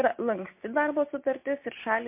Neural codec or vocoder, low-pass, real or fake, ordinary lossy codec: none; 3.6 kHz; real; MP3, 24 kbps